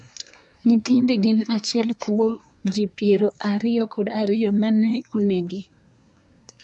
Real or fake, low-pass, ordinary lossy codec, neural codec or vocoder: fake; 10.8 kHz; none; codec, 24 kHz, 1 kbps, SNAC